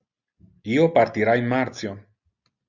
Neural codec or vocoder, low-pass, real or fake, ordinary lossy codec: none; 7.2 kHz; real; Opus, 64 kbps